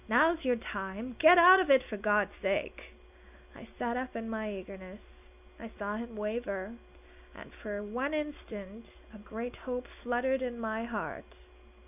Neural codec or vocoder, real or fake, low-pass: none; real; 3.6 kHz